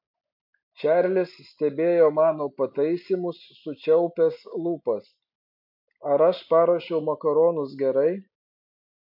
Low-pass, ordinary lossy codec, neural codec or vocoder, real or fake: 5.4 kHz; MP3, 32 kbps; none; real